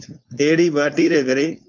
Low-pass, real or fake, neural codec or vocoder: 7.2 kHz; fake; codec, 16 kHz, 4.8 kbps, FACodec